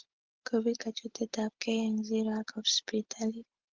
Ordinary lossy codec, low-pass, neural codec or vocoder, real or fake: Opus, 16 kbps; 7.2 kHz; none; real